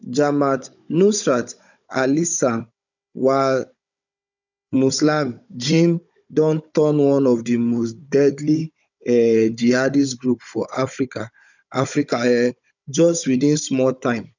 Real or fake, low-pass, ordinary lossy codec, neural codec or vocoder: fake; 7.2 kHz; none; codec, 16 kHz, 16 kbps, FunCodec, trained on Chinese and English, 50 frames a second